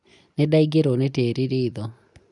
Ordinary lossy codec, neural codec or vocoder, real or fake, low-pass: none; none; real; 10.8 kHz